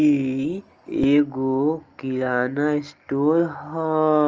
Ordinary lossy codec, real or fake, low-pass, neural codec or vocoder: Opus, 24 kbps; real; 7.2 kHz; none